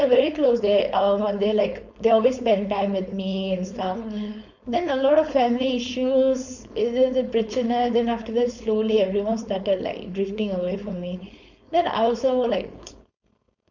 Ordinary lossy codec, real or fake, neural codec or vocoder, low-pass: none; fake; codec, 16 kHz, 4.8 kbps, FACodec; 7.2 kHz